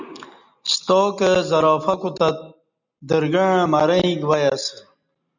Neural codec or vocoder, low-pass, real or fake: none; 7.2 kHz; real